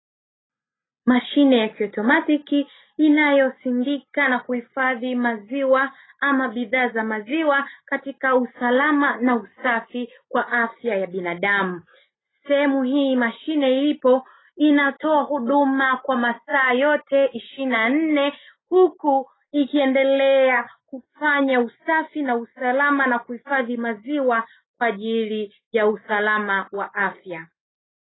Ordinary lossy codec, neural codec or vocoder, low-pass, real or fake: AAC, 16 kbps; none; 7.2 kHz; real